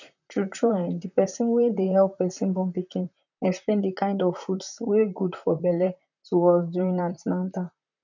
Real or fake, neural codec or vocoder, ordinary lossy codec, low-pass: fake; vocoder, 44.1 kHz, 128 mel bands, Pupu-Vocoder; none; 7.2 kHz